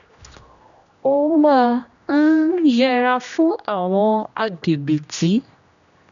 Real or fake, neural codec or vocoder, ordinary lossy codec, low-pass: fake; codec, 16 kHz, 1 kbps, X-Codec, HuBERT features, trained on general audio; none; 7.2 kHz